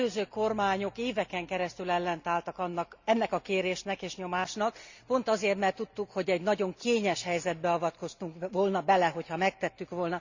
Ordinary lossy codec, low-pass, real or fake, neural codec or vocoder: Opus, 64 kbps; 7.2 kHz; real; none